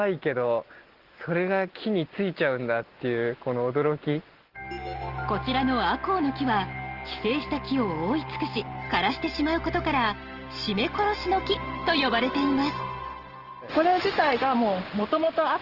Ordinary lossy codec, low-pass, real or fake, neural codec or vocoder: Opus, 16 kbps; 5.4 kHz; real; none